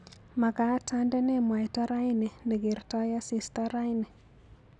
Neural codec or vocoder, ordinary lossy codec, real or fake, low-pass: none; none; real; 10.8 kHz